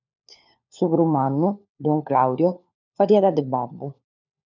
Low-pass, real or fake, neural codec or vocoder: 7.2 kHz; fake; codec, 16 kHz, 4 kbps, FunCodec, trained on LibriTTS, 50 frames a second